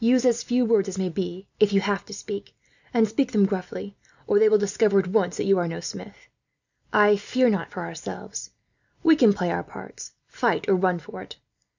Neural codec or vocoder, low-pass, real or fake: none; 7.2 kHz; real